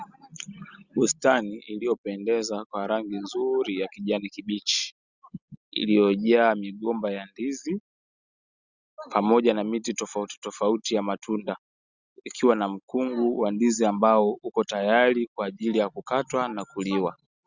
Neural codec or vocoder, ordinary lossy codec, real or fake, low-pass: none; Opus, 64 kbps; real; 7.2 kHz